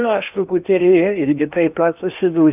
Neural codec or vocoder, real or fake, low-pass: codec, 16 kHz in and 24 kHz out, 0.8 kbps, FocalCodec, streaming, 65536 codes; fake; 3.6 kHz